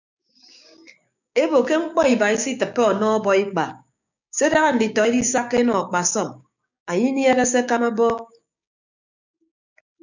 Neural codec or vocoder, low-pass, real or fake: codec, 16 kHz, 6 kbps, DAC; 7.2 kHz; fake